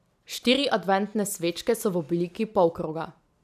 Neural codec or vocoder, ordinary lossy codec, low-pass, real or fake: none; none; 14.4 kHz; real